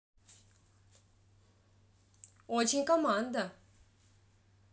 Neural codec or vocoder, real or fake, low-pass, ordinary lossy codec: none; real; none; none